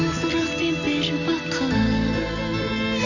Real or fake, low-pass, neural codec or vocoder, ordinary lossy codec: real; 7.2 kHz; none; none